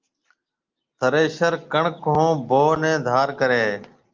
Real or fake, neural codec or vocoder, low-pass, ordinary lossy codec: real; none; 7.2 kHz; Opus, 32 kbps